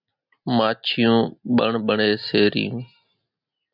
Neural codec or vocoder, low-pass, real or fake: none; 5.4 kHz; real